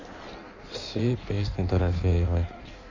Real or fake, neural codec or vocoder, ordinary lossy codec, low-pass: fake; codec, 16 kHz in and 24 kHz out, 1.1 kbps, FireRedTTS-2 codec; none; 7.2 kHz